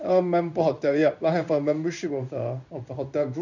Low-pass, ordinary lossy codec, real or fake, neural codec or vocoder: 7.2 kHz; none; fake; codec, 16 kHz in and 24 kHz out, 1 kbps, XY-Tokenizer